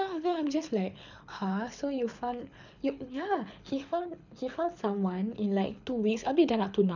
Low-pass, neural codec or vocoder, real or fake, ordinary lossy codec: 7.2 kHz; codec, 24 kHz, 6 kbps, HILCodec; fake; none